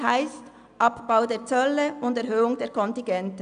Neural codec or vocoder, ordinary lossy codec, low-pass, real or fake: none; none; 9.9 kHz; real